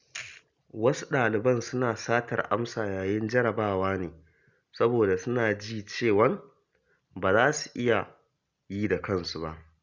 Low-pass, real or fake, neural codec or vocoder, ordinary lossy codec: 7.2 kHz; real; none; Opus, 64 kbps